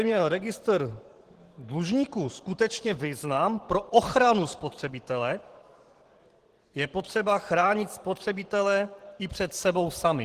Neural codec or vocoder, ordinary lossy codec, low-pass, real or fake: vocoder, 44.1 kHz, 128 mel bands every 512 samples, BigVGAN v2; Opus, 16 kbps; 14.4 kHz; fake